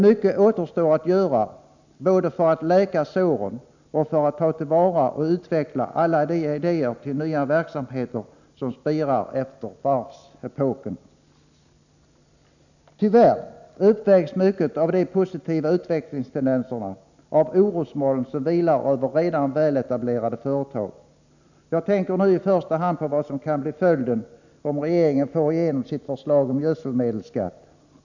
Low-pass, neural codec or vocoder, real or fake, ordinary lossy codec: 7.2 kHz; none; real; none